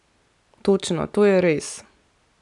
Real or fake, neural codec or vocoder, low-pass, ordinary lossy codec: real; none; 10.8 kHz; none